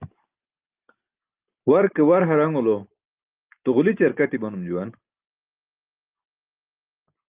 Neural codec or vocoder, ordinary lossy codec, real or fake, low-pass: none; Opus, 32 kbps; real; 3.6 kHz